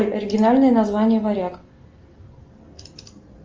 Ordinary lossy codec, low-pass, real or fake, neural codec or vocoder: Opus, 24 kbps; 7.2 kHz; real; none